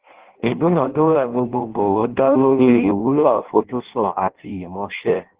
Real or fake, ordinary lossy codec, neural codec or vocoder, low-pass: fake; Opus, 16 kbps; codec, 16 kHz in and 24 kHz out, 0.6 kbps, FireRedTTS-2 codec; 3.6 kHz